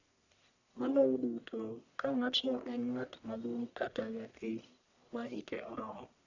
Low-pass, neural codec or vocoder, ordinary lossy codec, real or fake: 7.2 kHz; codec, 44.1 kHz, 1.7 kbps, Pupu-Codec; none; fake